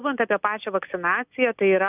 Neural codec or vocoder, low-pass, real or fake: none; 3.6 kHz; real